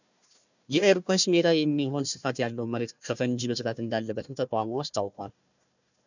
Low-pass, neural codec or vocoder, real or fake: 7.2 kHz; codec, 16 kHz, 1 kbps, FunCodec, trained on Chinese and English, 50 frames a second; fake